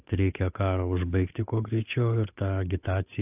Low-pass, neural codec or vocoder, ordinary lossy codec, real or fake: 3.6 kHz; codec, 16 kHz, 8 kbps, FunCodec, trained on Chinese and English, 25 frames a second; AAC, 32 kbps; fake